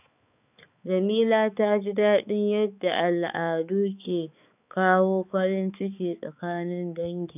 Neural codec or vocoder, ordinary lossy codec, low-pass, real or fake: codec, 16 kHz, 4 kbps, X-Codec, HuBERT features, trained on balanced general audio; none; 3.6 kHz; fake